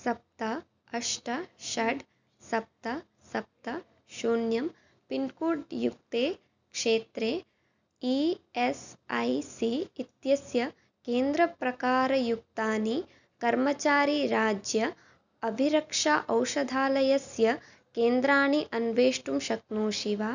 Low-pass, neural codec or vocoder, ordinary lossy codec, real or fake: 7.2 kHz; none; none; real